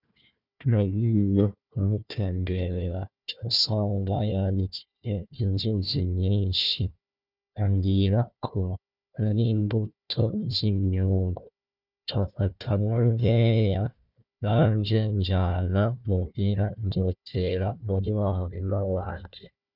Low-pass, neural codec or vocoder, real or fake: 5.4 kHz; codec, 16 kHz, 1 kbps, FunCodec, trained on Chinese and English, 50 frames a second; fake